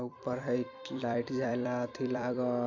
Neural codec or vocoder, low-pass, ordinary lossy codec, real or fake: vocoder, 44.1 kHz, 128 mel bands every 256 samples, BigVGAN v2; 7.2 kHz; none; fake